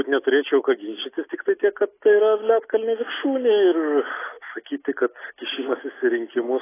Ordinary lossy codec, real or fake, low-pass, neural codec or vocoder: AAC, 16 kbps; real; 3.6 kHz; none